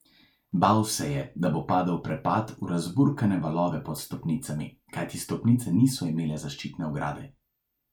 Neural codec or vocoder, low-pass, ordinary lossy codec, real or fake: none; 19.8 kHz; none; real